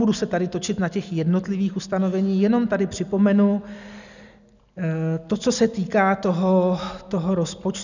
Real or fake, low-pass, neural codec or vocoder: real; 7.2 kHz; none